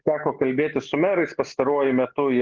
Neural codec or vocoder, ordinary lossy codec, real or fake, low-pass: none; Opus, 16 kbps; real; 7.2 kHz